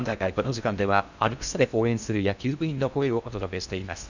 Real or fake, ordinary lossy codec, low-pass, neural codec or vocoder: fake; none; 7.2 kHz; codec, 16 kHz in and 24 kHz out, 0.6 kbps, FocalCodec, streaming, 4096 codes